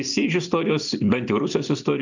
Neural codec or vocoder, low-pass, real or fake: none; 7.2 kHz; real